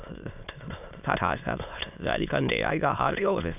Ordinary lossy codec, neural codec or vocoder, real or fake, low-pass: none; autoencoder, 22.05 kHz, a latent of 192 numbers a frame, VITS, trained on many speakers; fake; 3.6 kHz